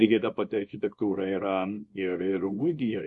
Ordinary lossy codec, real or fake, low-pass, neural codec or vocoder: MP3, 48 kbps; fake; 10.8 kHz; codec, 24 kHz, 0.9 kbps, WavTokenizer, small release